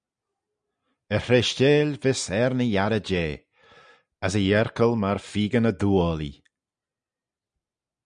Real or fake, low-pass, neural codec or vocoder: real; 9.9 kHz; none